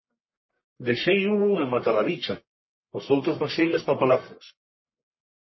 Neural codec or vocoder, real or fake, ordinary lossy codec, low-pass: codec, 44.1 kHz, 1.7 kbps, Pupu-Codec; fake; MP3, 24 kbps; 7.2 kHz